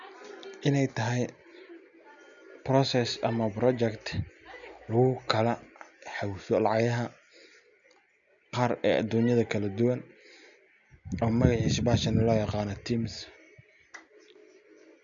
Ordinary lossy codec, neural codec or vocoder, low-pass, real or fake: none; none; 7.2 kHz; real